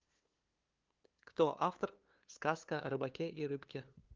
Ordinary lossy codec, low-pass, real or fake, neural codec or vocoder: Opus, 32 kbps; 7.2 kHz; fake; codec, 16 kHz, 2 kbps, FunCodec, trained on LibriTTS, 25 frames a second